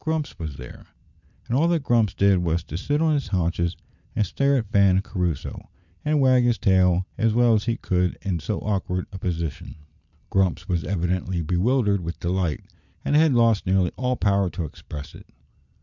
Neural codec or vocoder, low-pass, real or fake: none; 7.2 kHz; real